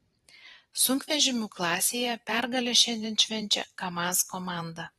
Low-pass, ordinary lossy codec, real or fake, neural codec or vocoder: 19.8 kHz; AAC, 32 kbps; fake; vocoder, 44.1 kHz, 128 mel bands every 512 samples, BigVGAN v2